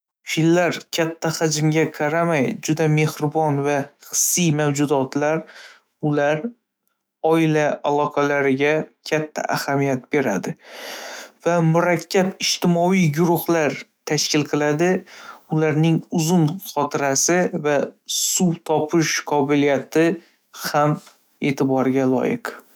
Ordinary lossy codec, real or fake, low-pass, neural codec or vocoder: none; fake; none; autoencoder, 48 kHz, 128 numbers a frame, DAC-VAE, trained on Japanese speech